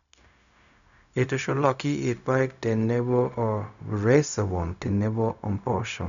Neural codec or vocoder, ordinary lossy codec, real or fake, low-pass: codec, 16 kHz, 0.4 kbps, LongCat-Audio-Codec; none; fake; 7.2 kHz